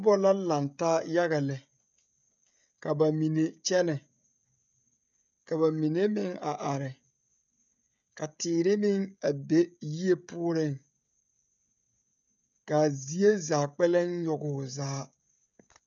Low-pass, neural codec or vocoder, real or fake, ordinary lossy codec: 7.2 kHz; codec, 16 kHz, 16 kbps, FreqCodec, smaller model; fake; MP3, 96 kbps